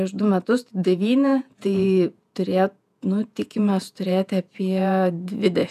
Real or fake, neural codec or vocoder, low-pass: fake; vocoder, 48 kHz, 128 mel bands, Vocos; 14.4 kHz